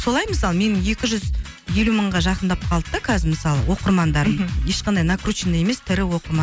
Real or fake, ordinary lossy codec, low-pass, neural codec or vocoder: real; none; none; none